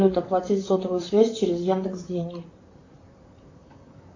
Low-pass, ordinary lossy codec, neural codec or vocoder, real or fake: 7.2 kHz; AAC, 48 kbps; vocoder, 44.1 kHz, 128 mel bands, Pupu-Vocoder; fake